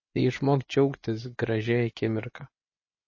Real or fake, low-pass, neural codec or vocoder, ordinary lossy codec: fake; 7.2 kHz; vocoder, 24 kHz, 100 mel bands, Vocos; MP3, 32 kbps